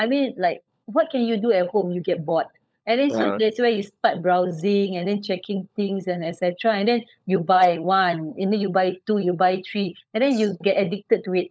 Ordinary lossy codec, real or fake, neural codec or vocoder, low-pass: none; fake; codec, 16 kHz, 16 kbps, FunCodec, trained on LibriTTS, 50 frames a second; none